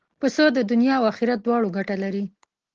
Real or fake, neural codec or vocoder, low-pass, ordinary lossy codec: real; none; 7.2 kHz; Opus, 16 kbps